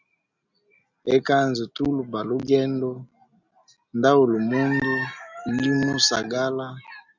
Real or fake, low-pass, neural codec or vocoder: real; 7.2 kHz; none